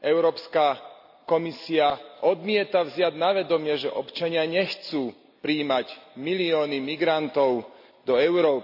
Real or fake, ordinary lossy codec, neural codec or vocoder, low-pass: real; AAC, 48 kbps; none; 5.4 kHz